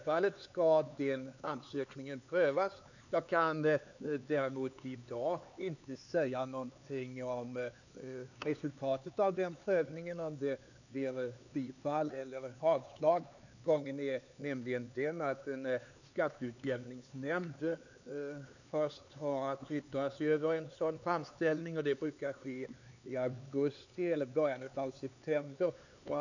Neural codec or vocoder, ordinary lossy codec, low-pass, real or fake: codec, 16 kHz, 4 kbps, X-Codec, HuBERT features, trained on LibriSpeech; none; 7.2 kHz; fake